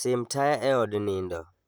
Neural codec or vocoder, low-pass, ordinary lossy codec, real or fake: vocoder, 44.1 kHz, 128 mel bands, Pupu-Vocoder; none; none; fake